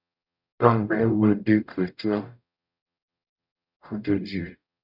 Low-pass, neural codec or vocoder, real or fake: 5.4 kHz; codec, 44.1 kHz, 0.9 kbps, DAC; fake